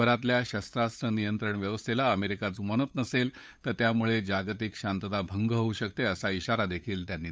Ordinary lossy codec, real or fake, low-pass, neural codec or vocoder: none; fake; none; codec, 16 kHz, 8 kbps, FunCodec, trained on LibriTTS, 25 frames a second